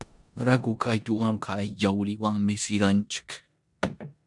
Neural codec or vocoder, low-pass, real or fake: codec, 16 kHz in and 24 kHz out, 0.9 kbps, LongCat-Audio-Codec, four codebook decoder; 10.8 kHz; fake